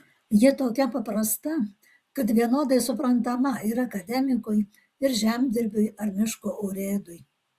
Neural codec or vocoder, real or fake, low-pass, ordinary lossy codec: vocoder, 44.1 kHz, 128 mel bands, Pupu-Vocoder; fake; 14.4 kHz; Opus, 64 kbps